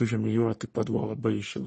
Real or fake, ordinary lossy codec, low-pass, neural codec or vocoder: fake; MP3, 32 kbps; 10.8 kHz; codec, 44.1 kHz, 3.4 kbps, Pupu-Codec